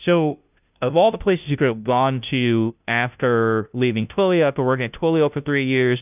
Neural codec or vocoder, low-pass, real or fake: codec, 16 kHz, 0.5 kbps, FunCodec, trained on Chinese and English, 25 frames a second; 3.6 kHz; fake